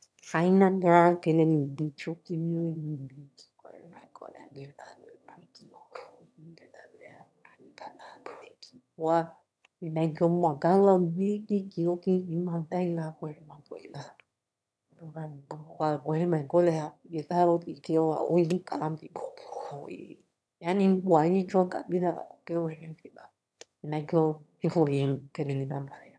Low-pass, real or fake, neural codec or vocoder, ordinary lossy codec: none; fake; autoencoder, 22.05 kHz, a latent of 192 numbers a frame, VITS, trained on one speaker; none